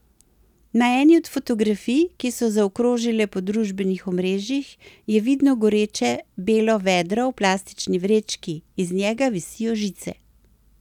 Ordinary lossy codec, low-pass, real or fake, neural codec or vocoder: none; 19.8 kHz; real; none